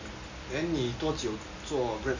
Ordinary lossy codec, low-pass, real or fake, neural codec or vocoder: Opus, 64 kbps; 7.2 kHz; real; none